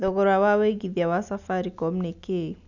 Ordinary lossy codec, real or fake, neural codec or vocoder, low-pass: none; real; none; 7.2 kHz